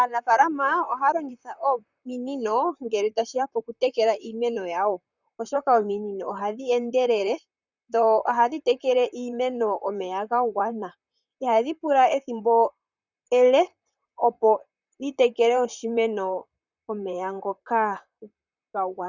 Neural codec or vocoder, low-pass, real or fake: vocoder, 44.1 kHz, 128 mel bands, Pupu-Vocoder; 7.2 kHz; fake